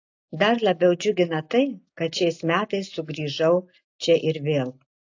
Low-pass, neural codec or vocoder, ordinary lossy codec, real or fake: 7.2 kHz; none; AAC, 48 kbps; real